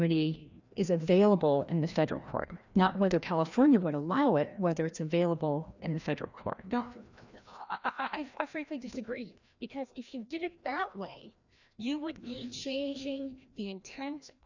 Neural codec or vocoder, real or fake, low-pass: codec, 16 kHz, 1 kbps, FreqCodec, larger model; fake; 7.2 kHz